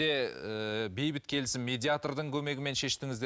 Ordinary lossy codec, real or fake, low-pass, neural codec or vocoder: none; real; none; none